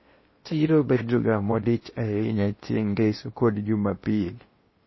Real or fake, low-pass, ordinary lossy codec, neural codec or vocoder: fake; 7.2 kHz; MP3, 24 kbps; codec, 16 kHz in and 24 kHz out, 0.6 kbps, FocalCodec, streaming, 4096 codes